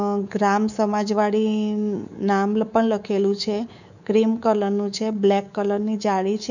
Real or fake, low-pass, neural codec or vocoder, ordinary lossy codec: fake; 7.2 kHz; codec, 24 kHz, 3.1 kbps, DualCodec; none